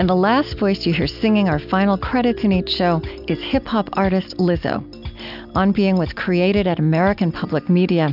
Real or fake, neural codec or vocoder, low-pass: real; none; 5.4 kHz